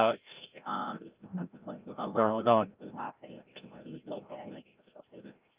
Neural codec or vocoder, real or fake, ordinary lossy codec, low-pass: codec, 16 kHz, 0.5 kbps, FreqCodec, larger model; fake; Opus, 32 kbps; 3.6 kHz